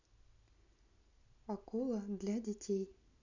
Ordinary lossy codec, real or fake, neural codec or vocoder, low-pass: none; real; none; 7.2 kHz